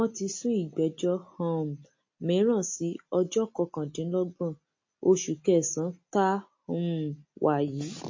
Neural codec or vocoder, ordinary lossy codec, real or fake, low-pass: none; MP3, 32 kbps; real; 7.2 kHz